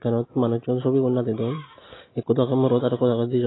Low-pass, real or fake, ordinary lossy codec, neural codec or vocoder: 7.2 kHz; real; AAC, 16 kbps; none